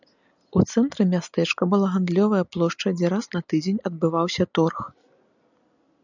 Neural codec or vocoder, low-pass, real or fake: none; 7.2 kHz; real